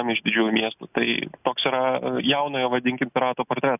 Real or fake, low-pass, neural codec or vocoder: real; 3.6 kHz; none